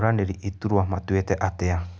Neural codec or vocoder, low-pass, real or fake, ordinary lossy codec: none; none; real; none